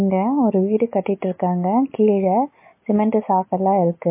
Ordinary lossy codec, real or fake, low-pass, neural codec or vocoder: MP3, 24 kbps; real; 3.6 kHz; none